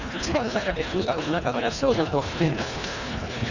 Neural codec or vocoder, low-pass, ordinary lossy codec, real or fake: codec, 24 kHz, 1.5 kbps, HILCodec; 7.2 kHz; none; fake